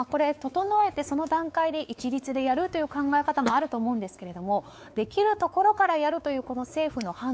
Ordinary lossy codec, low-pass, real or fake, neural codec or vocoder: none; none; fake; codec, 16 kHz, 4 kbps, X-Codec, WavLM features, trained on Multilingual LibriSpeech